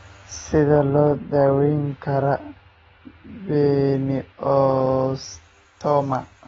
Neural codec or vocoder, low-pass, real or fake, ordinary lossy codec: none; 19.8 kHz; real; AAC, 24 kbps